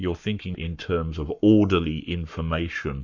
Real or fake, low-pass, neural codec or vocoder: fake; 7.2 kHz; codec, 44.1 kHz, 7.8 kbps, Pupu-Codec